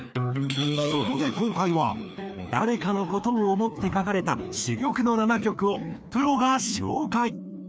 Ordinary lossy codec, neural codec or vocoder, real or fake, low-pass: none; codec, 16 kHz, 2 kbps, FreqCodec, larger model; fake; none